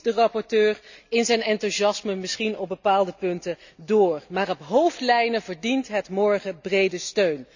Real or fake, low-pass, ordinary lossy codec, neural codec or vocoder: real; 7.2 kHz; none; none